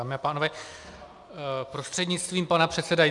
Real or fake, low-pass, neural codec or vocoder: real; 10.8 kHz; none